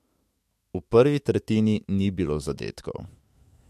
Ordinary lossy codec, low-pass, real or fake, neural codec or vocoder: MP3, 64 kbps; 14.4 kHz; fake; autoencoder, 48 kHz, 128 numbers a frame, DAC-VAE, trained on Japanese speech